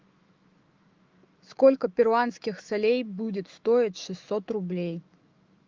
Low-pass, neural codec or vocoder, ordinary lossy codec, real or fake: 7.2 kHz; autoencoder, 48 kHz, 128 numbers a frame, DAC-VAE, trained on Japanese speech; Opus, 32 kbps; fake